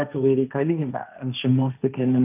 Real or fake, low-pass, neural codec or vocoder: fake; 3.6 kHz; codec, 16 kHz, 1.1 kbps, Voila-Tokenizer